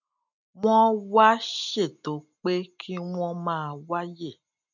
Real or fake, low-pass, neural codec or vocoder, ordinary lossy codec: real; 7.2 kHz; none; none